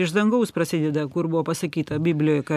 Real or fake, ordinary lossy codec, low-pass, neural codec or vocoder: real; MP3, 96 kbps; 14.4 kHz; none